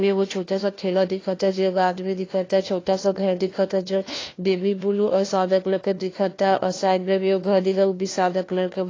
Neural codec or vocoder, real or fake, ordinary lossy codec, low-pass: codec, 16 kHz, 1 kbps, FunCodec, trained on LibriTTS, 50 frames a second; fake; AAC, 32 kbps; 7.2 kHz